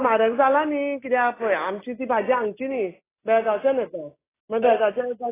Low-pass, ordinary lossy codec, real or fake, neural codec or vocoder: 3.6 kHz; AAC, 16 kbps; real; none